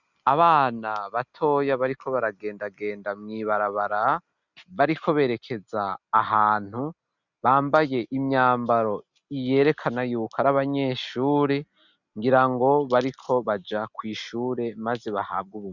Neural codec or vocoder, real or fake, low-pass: none; real; 7.2 kHz